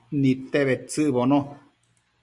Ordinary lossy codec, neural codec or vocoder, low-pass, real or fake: Opus, 64 kbps; none; 10.8 kHz; real